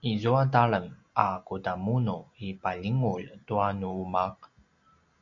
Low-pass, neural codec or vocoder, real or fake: 7.2 kHz; none; real